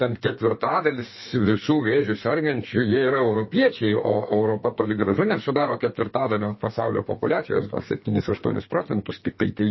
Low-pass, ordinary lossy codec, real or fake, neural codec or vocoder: 7.2 kHz; MP3, 24 kbps; fake; codec, 32 kHz, 1.9 kbps, SNAC